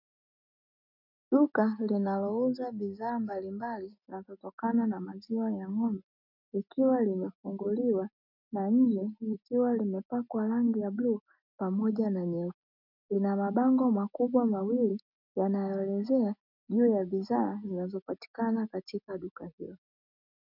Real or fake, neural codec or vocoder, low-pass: real; none; 5.4 kHz